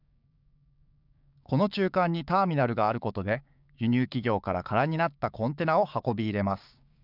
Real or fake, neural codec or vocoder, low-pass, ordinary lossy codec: fake; codec, 16 kHz, 6 kbps, DAC; 5.4 kHz; none